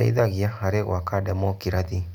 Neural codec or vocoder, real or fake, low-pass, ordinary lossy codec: none; real; 19.8 kHz; none